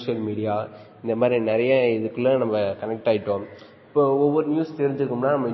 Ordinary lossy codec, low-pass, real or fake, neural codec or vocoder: MP3, 24 kbps; 7.2 kHz; real; none